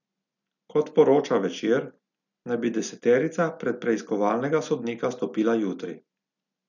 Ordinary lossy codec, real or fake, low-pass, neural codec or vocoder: none; real; 7.2 kHz; none